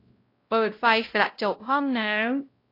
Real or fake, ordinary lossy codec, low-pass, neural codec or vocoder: fake; AAC, 32 kbps; 5.4 kHz; codec, 16 kHz, 0.5 kbps, X-Codec, WavLM features, trained on Multilingual LibriSpeech